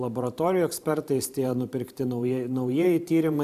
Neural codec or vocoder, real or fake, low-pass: vocoder, 44.1 kHz, 128 mel bands every 512 samples, BigVGAN v2; fake; 14.4 kHz